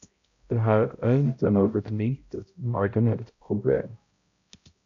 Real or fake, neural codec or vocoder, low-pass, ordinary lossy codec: fake; codec, 16 kHz, 0.5 kbps, X-Codec, HuBERT features, trained on balanced general audio; 7.2 kHz; AAC, 48 kbps